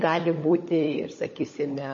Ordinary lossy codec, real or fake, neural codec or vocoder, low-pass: MP3, 32 kbps; fake; codec, 16 kHz, 16 kbps, FunCodec, trained on LibriTTS, 50 frames a second; 7.2 kHz